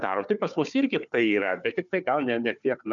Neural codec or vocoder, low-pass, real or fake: codec, 16 kHz, 4 kbps, FunCodec, trained on Chinese and English, 50 frames a second; 7.2 kHz; fake